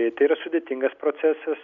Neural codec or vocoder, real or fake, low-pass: none; real; 7.2 kHz